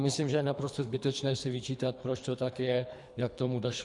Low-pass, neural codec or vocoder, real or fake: 10.8 kHz; codec, 24 kHz, 3 kbps, HILCodec; fake